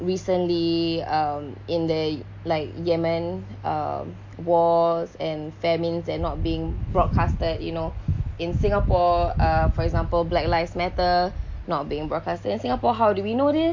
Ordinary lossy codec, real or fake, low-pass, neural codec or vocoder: MP3, 48 kbps; real; 7.2 kHz; none